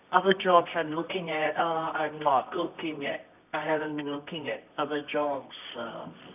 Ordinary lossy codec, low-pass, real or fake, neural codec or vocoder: none; 3.6 kHz; fake; codec, 24 kHz, 0.9 kbps, WavTokenizer, medium music audio release